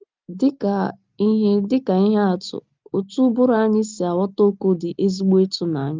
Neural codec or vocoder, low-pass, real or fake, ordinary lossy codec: none; 7.2 kHz; real; Opus, 24 kbps